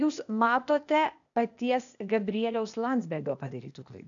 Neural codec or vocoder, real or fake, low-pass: codec, 16 kHz, 0.8 kbps, ZipCodec; fake; 7.2 kHz